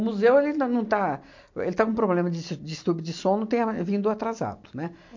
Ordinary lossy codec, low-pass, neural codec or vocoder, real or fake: MP3, 48 kbps; 7.2 kHz; none; real